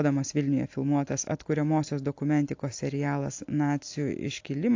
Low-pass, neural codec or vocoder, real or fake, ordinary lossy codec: 7.2 kHz; none; real; AAC, 48 kbps